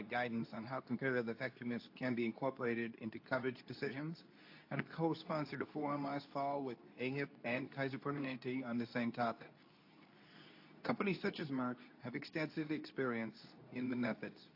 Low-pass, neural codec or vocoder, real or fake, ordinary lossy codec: 5.4 kHz; codec, 24 kHz, 0.9 kbps, WavTokenizer, medium speech release version 2; fake; AAC, 32 kbps